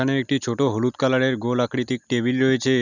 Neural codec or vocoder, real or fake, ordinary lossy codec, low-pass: none; real; none; 7.2 kHz